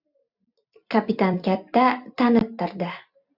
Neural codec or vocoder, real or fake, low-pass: vocoder, 44.1 kHz, 128 mel bands every 256 samples, BigVGAN v2; fake; 5.4 kHz